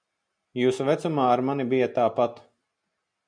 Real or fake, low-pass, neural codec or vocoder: real; 9.9 kHz; none